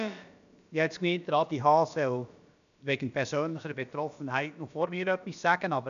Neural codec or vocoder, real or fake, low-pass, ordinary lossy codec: codec, 16 kHz, about 1 kbps, DyCAST, with the encoder's durations; fake; 7.2 kHz; none